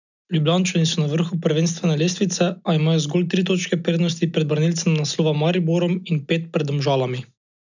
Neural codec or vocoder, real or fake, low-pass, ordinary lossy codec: none; real; 7.2 kHz; none